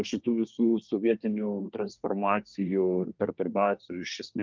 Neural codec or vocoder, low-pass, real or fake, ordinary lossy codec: codec, 24 kHz, 1 kbps, SNAC; 7.2 kHz; fake; Opus, 24 kbps